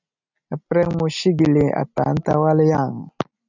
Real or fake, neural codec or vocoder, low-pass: real; none; 7.2 kHz